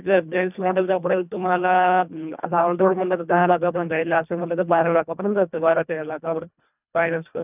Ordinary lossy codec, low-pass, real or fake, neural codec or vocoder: none; 3.6 kHz; fake; codec, 24 kHz, 1.5 kbps, HILCodec